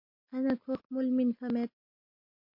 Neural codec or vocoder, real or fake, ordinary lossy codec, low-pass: none; real; MP3, 32 kbps; 5.4 kHz